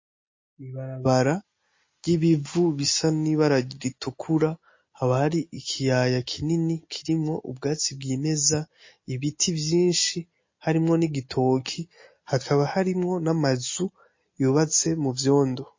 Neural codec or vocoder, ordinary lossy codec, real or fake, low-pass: none; MP3, 32 kbps; real; 7.2 kHz